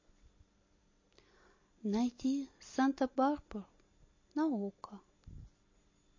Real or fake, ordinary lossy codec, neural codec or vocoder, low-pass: real; MP3, 32 kbps; none; 7.2 kHz